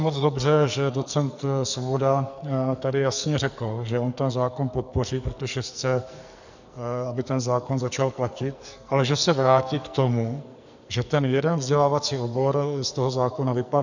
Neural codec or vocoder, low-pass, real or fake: codec, 44.1 kHz, 2.6 kbps, SNAC; 7.2 kHz; fake